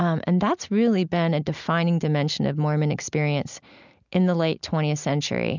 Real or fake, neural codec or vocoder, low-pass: real; none; 7.2 kHz